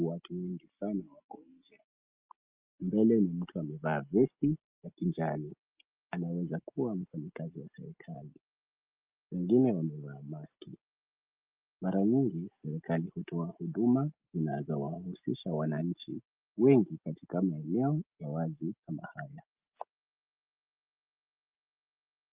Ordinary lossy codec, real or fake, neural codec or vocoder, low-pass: Opus, 32 kbps; real; none; 3.6 kHz